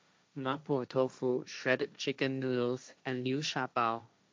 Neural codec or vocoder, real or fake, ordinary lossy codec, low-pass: codec, 16 kHz, 1.1 kbps, Voila-Tokenizer; fake; none; none